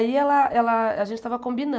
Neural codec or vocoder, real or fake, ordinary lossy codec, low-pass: none; real; none; none